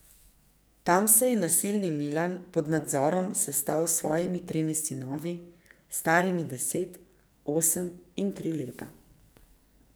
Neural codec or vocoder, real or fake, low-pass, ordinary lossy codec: codec, 44.1 kHz, 2.6 kbps, SNAC; fake; none; none